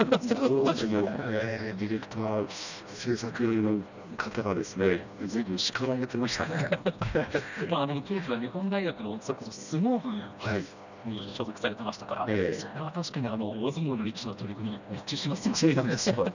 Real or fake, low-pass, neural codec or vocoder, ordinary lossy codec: fake; 7.2 kHz; codec, 16 kHz, 1 kbps, FreqCodec, smaller model; none